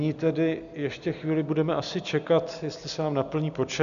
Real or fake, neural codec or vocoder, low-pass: real; none; 7.2 kHz